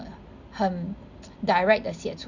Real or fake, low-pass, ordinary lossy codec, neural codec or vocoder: real; 7.2 kHz; none; none